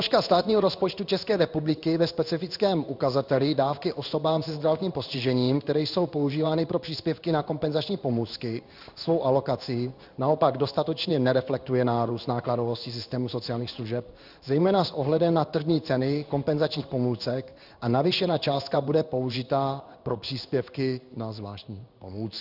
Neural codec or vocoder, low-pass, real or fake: codec, 16 kHz in and 24 kHz out, 1 kbps, XY-Tokenizer; 5.4 kHz; fake